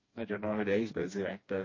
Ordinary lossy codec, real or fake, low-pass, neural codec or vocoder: MP3, 32 kbps; fake; 7.2 kHz; codec, 16 kHz, 2 kbps, FreqCodec, smaller model